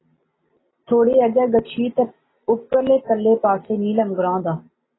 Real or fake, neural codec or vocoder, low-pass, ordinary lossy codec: real; none; 7.2 kHz; AAC, 16 kbps